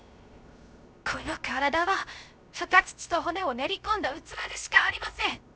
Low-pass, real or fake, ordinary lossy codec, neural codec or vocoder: none; fake; none; codec, 16 kHz, 0.3 kbps, FocalCodec